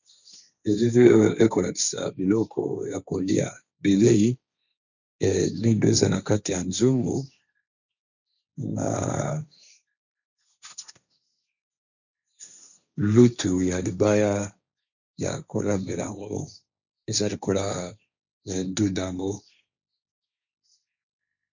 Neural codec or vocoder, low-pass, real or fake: codec, 16 kHz, 1.1 kbps, Voila-Tokenizer; 7.2 kHz; fake